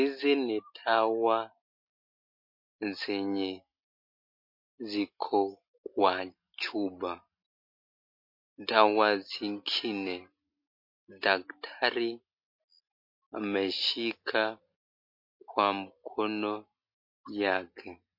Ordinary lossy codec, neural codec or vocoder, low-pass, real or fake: MP3, 32 kbps; none; 5.4 kHz; real